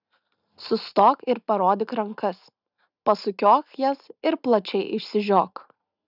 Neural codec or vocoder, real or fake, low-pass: none; real; 5.4 kHz